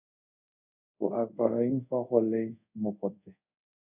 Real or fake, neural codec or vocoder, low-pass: fake; codec, 24 kHz, 0.5 kbps, DualCodec; 3.6 kHz